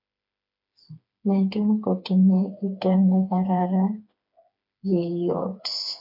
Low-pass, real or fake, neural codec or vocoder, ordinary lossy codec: 5.4 kHz; fake; codec, 16 kHz, 4 kbps, FreqCodec, smaller model; MP3, 48 kbps